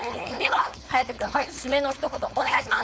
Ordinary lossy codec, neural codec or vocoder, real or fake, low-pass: none; codec, 16 kHz, 4.8 kbps, FACodec; fake; none